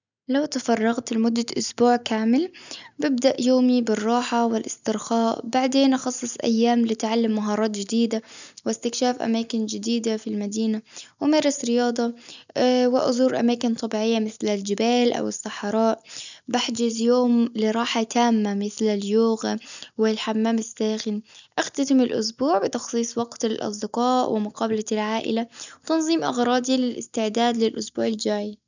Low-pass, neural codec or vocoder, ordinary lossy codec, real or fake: 7.2 kHz; none; none; real